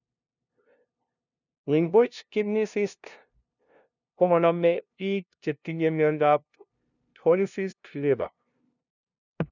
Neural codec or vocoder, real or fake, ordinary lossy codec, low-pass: codec, 16 kHz, 0.5 kbps, FunCodec, trained on LibriTTS, 25 frames a second; fake; none; 7.2 kHz